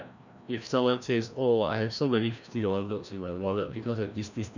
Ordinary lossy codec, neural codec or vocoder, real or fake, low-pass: none; codec, 16 kHz, 1 kbps, FreqCodec, larger model; fake; 7.2 kHz